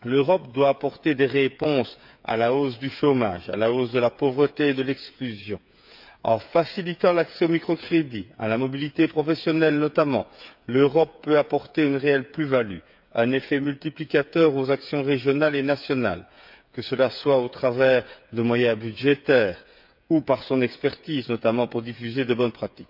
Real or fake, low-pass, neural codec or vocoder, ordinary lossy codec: fake; 5.4 kHz; codec, 16 kHz, 8 kbps, FreqCodec, smaller model; none